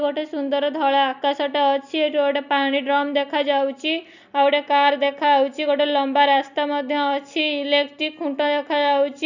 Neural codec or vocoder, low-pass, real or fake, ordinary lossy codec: none; 7.2 kHz; real; none